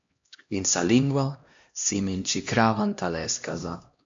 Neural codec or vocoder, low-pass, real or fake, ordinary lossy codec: codec, 16 kHz, 1 kbps, X-Codec, HuBERT features, trained on LibriSpeech; 7.2 kHz; fake; MP3, 48 kbps